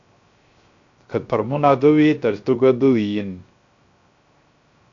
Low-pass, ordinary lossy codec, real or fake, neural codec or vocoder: 7.2 kHz; AAC, 64 kbps; fake; codec, 16 kHz, 0.3 kbps, FocalCodec